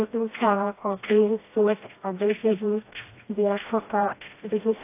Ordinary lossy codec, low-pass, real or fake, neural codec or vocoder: AAC, 24 kbps; 3.6 kHz; fake; codec, 16 kHz, 1 kbps, FreqCodec, smaller model